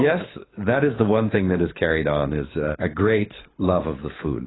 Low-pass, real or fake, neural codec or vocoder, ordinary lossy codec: 7.2 kHz; fake; codec, 24 kHz, 6 kbps, HILCodec; AAC, 16 kbps